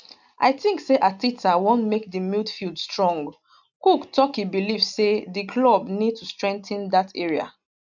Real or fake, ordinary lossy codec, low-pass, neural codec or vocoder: real; none; 7.2 kHz; none